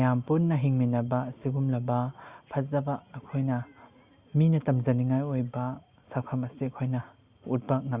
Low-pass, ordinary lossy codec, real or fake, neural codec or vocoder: 3.6 kHz; Opus, 64 kbps; real; none